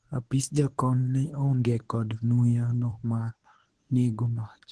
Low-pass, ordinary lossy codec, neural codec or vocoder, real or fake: 10.8 kHz; Opus, 16 kbps; codec, 24 kHz, 0.9 kbps, WavTokenizer, medium speech release version 1; fake